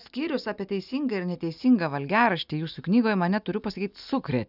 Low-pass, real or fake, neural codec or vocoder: 5.4 kHz; real; none